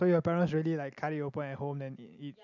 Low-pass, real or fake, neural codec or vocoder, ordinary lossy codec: 7.2 kHz; real; none; none